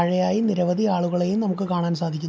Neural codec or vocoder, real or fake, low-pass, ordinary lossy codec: none; real; none; none